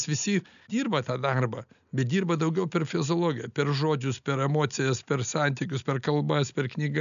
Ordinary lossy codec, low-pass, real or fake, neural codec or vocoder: MP3, 96 kbps; 7.2 kHz; real; none